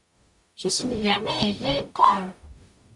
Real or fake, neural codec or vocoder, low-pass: fake; codec, 44.1 kHz, 0.9 kbps, DAC; 10.8 kHz